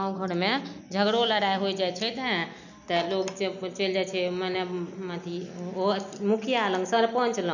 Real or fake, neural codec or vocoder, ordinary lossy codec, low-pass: real; none; none; 7.2 kHz